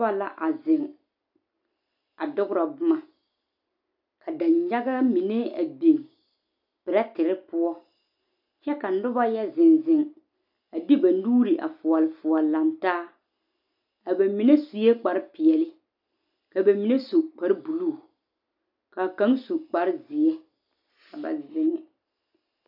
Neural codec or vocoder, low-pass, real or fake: none; 5.4 kHz; real